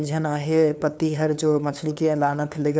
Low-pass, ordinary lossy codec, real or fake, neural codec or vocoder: none; none; fake; codec, 16 kHz, 2 kbps, FunCodec, trained on LibriTTS, 25 frames a second